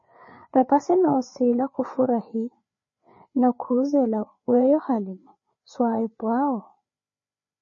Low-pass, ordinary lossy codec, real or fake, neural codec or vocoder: 7.2 kHz; MP3, 32 kbps; fake; codec, 16 kHz, 4 kbps, FreqCodec, larger model